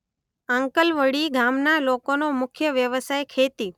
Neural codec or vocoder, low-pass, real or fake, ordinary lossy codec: none; 19.8 kHz; real; none